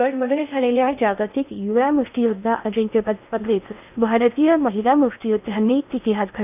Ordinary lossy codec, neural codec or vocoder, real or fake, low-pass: none; codec, 16 kHz in and 24 kHz out, 0.6 kbps, FocalCodec, streaming, 2048 codes; fake; 3.6 kHz